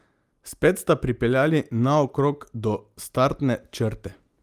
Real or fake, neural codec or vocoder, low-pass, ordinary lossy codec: real; none; 14.4 kHz; Opus, 32 kbps